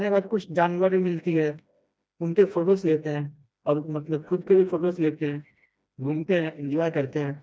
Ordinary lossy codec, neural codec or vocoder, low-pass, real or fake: none; codec, 16 kHz, 1 kbps, FreqCodec, smaller model; none; fake